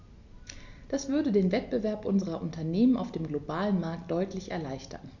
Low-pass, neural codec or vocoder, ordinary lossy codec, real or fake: 7.2 kHz; none; Opus, 64 kbps; real